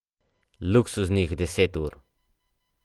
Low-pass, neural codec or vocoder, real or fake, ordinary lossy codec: 19.8 kHz; none; real; Opus, 24 kbps